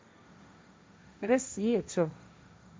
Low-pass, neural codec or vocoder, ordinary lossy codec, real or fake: none; codec, 16 kHz, 1.1 kbps, Voila-Tokenizer; none; fake